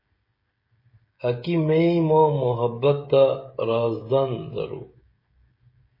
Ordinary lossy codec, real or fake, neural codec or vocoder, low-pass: MP3, 24 kbps; fake; codec, 16 kHz, 16 kbps, FreqCodec, smaller model; 5.4 kHz